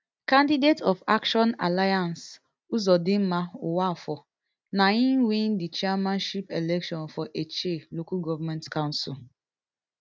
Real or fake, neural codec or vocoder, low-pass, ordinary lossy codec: real; none; none; none